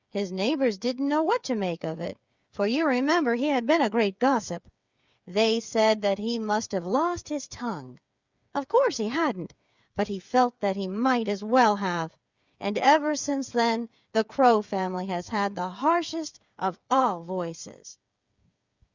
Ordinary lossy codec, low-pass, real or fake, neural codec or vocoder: Opus, 64 kbps; 7.2 kHz; fake; codec, 16 kHz, 8 kbps, FreqCodec, smaller model